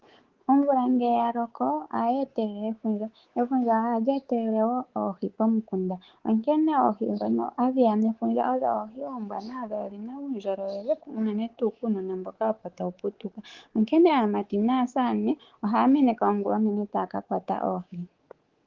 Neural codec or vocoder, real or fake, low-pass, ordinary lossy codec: codec, 24 kHz, 3.1 kbps, DualCodec; fake; 7.2 kHz; Opus, 16 kbps